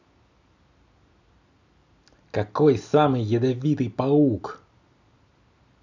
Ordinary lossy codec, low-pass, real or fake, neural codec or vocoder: none; 7.2 kHz; real; none